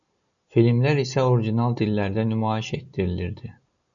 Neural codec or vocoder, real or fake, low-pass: none; real; 7.2 kHz